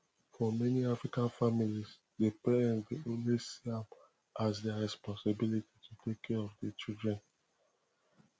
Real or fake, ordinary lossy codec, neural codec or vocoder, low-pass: real; none; none; none